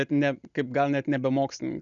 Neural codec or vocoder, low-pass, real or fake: none; 7.2 kHz; real